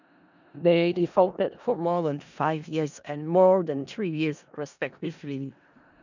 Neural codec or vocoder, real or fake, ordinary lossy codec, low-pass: codec, 16 kHz in and 24 kHz out, 0.4 kbps, LongCat-Audio-Codec, four codebook decoder; fake; none; 7.2 kHz